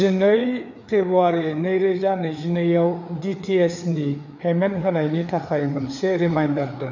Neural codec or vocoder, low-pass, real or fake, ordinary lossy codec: codec, 16 kHz, 4 kbps, FreqCodec, larger model; 7.2 kHz; fake; none